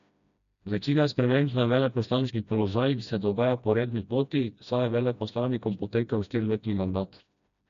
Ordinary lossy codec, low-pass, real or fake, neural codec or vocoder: none; 7.2 kHz; fake; codec, 16 kHz, 1 kbps, FreqCodec, smaller model